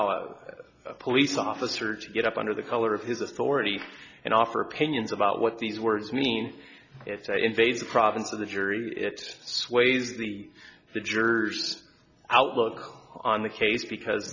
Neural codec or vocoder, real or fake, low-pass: none; real; 7.2 kHz